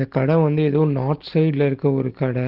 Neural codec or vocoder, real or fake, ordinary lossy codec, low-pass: none; real; Opus, 16 kbps; 5.4 kHz